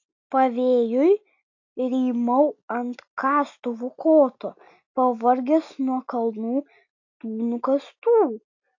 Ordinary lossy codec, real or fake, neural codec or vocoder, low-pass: AAC, 48 kbps; real; none; 7.2 kHz